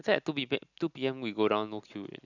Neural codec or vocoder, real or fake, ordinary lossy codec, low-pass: none; real; none; 7.2 kHz